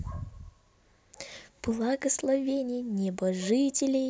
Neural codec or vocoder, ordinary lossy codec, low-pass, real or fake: none; none; none; real